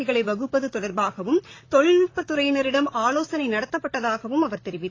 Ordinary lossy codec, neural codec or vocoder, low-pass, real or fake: AAC, 32 kbps; vocoder, 44.1 kHz, 128 mel bands, Pupu-Vocoder; 7.2 kHz; fake